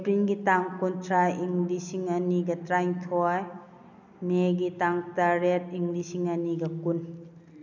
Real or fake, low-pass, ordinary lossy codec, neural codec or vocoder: real; 7.2 kHz; none; none